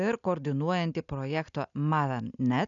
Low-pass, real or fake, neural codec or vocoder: 7.2 kHz; real; none